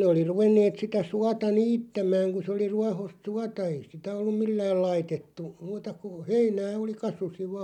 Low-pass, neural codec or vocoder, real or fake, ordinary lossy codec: 19.8 kHz; none; real; none